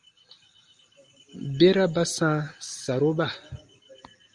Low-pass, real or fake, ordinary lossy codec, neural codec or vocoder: 10.8 kHz; real; Opus, 32 kbps; none